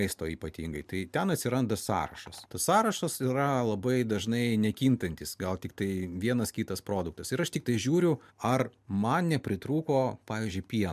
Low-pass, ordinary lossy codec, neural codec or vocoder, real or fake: 14.4 kHz; MP3, 96 kbps; none; real